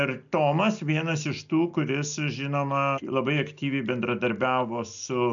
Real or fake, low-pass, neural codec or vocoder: real; 7.2 kHz; none